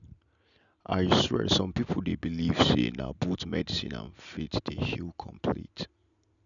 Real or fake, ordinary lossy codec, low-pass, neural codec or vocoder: real; none; 7.2 kHz; none